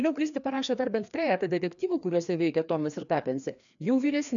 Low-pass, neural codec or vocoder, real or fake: 7.2 kHz; codec, 16 kHz, 2 kbps, FreqCodec, larger model; fake